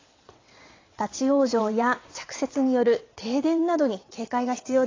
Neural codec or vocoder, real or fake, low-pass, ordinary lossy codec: vocoder, 22.05 kHz, 80 mel bands, WaveNeXt; fake; 7.2 kHz; AAC, 32 kbps